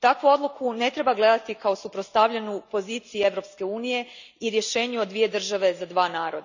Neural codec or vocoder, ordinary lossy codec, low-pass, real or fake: none; none; 7.2 kHz; real